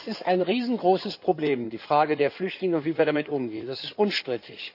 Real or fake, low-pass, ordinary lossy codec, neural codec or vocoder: fake; 5.4 kHz; none; codec, 16 kHz in and 24 kHz out, 2.2 kbps, FireRedTTS-2 codec